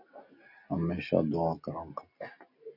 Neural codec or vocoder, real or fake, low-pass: none; real; 5.4 kHz